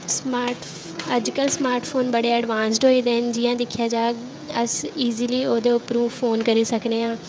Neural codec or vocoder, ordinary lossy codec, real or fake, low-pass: codec, 16 kHz, 16 kbps, FreqCodec, smaller model; none; fake; none